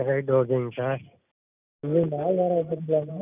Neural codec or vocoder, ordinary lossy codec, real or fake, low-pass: none; none; real; 3.6 kHz